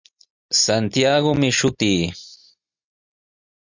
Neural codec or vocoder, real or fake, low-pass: none; real; 7.2 kHz